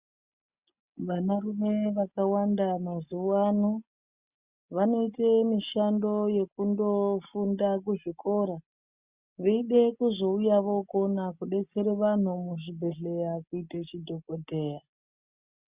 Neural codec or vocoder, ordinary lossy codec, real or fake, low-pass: none; Opus, 64 kbps; real; 3.6 kHz